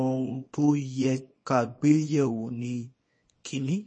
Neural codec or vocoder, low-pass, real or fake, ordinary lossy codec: codec, 24 kHz, 0.9 kbps, WavTokenizer, small release; 9.9 kHz; fake; MP3, 32 kbps